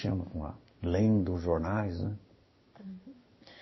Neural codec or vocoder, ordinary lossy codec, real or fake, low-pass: codec, 16 kHz in and 24 kHz out, 1 kbps, XY-Tokenizer; MP3, 24 kbps; fake; 7.2 kHz